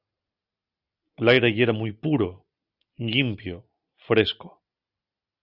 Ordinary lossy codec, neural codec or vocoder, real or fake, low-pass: Opus, 64 kbps; none; real; 5.4 kHz